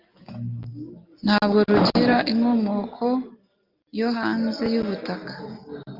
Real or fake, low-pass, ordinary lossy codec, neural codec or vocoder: real; 5.4 kHz; Opus, 24 kbps; none